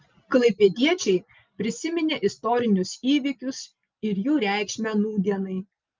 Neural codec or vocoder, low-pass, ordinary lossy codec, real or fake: none; 7.2 kHz; Opus, 24 kbps; real